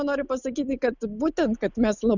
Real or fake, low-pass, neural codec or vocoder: real; 7.2 kHz; none